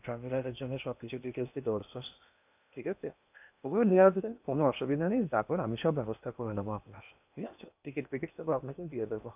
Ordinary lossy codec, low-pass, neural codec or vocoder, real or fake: none; 3.6 kHz; codec, 16 kHz in and 24 kHz out, 0.8 kbps, FocalCodec, streaming, 65536 codes; fake